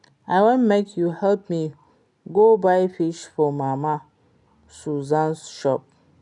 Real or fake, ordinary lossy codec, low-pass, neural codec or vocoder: real; none; 10.8 kHz; none